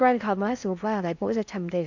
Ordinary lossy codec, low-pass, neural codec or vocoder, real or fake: none; 7.2 kHz; codec, 16 kHz in and 24 kHz out, 0.6 kbps, FocalCodec, streaming, 4096 codes; fake